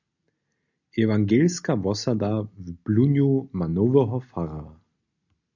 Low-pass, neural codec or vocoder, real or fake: 7.2 kHz; none; real